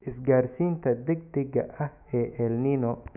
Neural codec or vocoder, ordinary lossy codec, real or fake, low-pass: autoencoder, 48 kHz, 128 numbers a frame, DAC-VAE, trained on Japanese speech; none; fake; 3.6 kHz